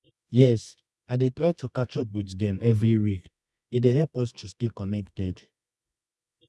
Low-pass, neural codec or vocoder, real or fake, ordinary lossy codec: none; codec, 24 kHz, 0.9 kbps, WavTokenizer, medium music audio release; fake; none